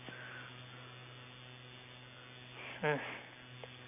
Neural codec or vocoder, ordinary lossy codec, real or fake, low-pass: none; none; real; 3.6 kHz